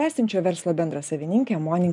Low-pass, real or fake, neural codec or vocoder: 10.8 kHz; real; none